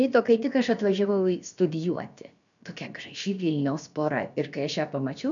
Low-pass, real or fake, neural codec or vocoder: 7.2 kHz; fake; codec, 16 kHz, about 1 kbps, DyCAST, with the encoder's durations